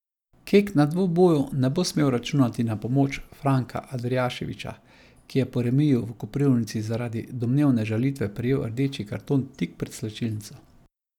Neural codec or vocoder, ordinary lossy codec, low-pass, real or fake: none; none; 19.8 kHz; real